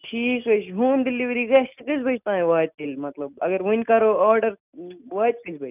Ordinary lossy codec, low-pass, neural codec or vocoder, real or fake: none; 3.6 kHz; none; real